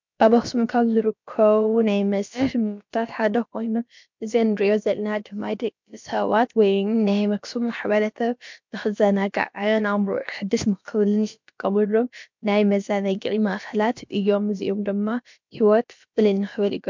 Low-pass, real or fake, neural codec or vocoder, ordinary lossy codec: 7.2 kHz; fake; codec, 16 kHz, about 1 kbps, DyCAST, with the encoder's durations; MP3, 64 kbps